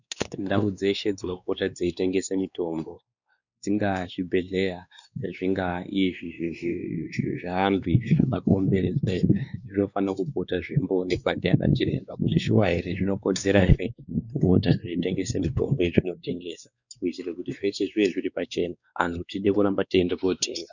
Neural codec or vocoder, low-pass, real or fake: codec, 16 kHz, 2 kbps, X-Codec, WavLM features, trained on Multilingual LibriSpeech; 7.2 kHz; fake